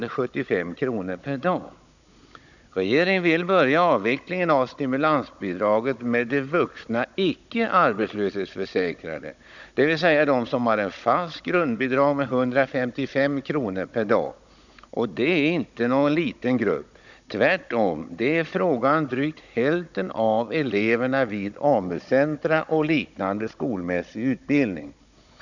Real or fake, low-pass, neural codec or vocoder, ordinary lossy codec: fake; 7.2 kHz; codec, 16 kHz, 16 kbps, FunCodec, trained on Chinese and English, 50 frames a second; none